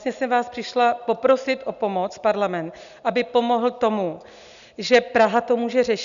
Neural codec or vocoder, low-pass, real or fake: none; 7.2 kHz; real